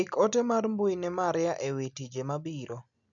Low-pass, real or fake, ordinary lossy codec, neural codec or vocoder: 7.2 kHz; real; none; none